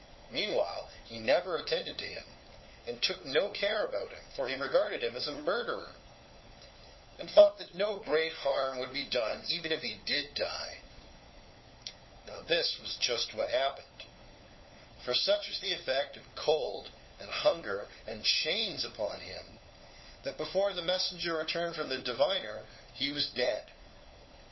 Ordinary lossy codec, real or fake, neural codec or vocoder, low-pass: MP3, 24 kbps; fake; codec, 16 kHz, 4 kbps, FunCodec, trained on LibriTTS, 50 frames a second; 7.2 kHz